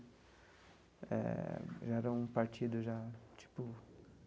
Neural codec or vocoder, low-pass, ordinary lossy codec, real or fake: none; none; none; real